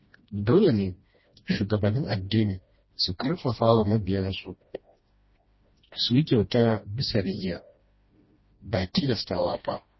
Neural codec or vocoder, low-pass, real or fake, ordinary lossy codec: codec, 16 kHz, 1 kbps, FreqCodec, smaller model; 7.2 kHz; fake; MP3, 24 kbps